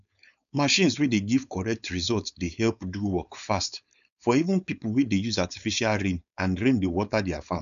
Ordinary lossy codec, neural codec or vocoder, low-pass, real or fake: none; codec, 16 kHz, 4.8 kbps, FACodec; 7.2 kHz; fake